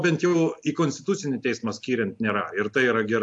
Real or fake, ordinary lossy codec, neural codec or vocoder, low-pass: real; AAC, 64 kbps; none; 10.8 kHz